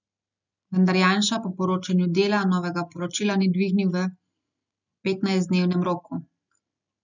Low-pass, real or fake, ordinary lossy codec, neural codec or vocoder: 7.2 kHz; real; none; none